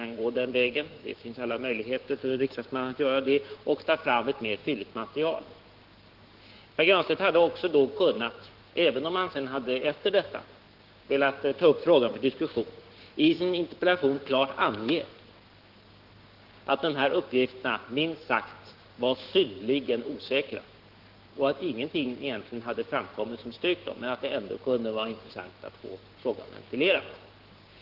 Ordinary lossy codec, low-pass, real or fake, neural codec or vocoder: Opus, 16 kbps; 5.4 kHz; fake; codec, 16 kHz, 6 kbps, DAC